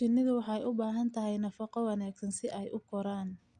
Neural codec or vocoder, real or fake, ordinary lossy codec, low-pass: none; real; Opus, 64 kbps; 9.9 kHz